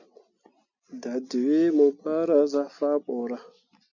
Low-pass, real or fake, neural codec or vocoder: 7.2 kHz; real; none